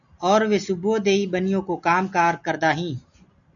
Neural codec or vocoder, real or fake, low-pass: none; real; 7.2 kHz